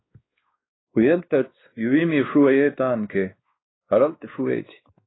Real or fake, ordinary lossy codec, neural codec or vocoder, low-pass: fake; AAC, 16 kbps; codec, 16 kHz, 2 kbps, X-Codec, WavLM features, trained on Multilingual LibriSpeech; 7.2 kHz